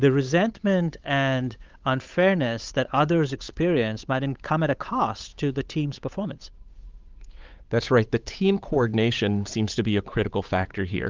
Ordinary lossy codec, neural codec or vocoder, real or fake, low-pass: Opus, 24 kbps; codec, 16 kHz, 8 kbps, FunCodec, trained on Chinese and English, 25 frames a second; fake; 7.2 kHz